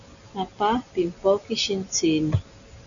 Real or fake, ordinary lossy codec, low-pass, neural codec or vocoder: real; MP3, 96 kbps; 7.2 kHz; none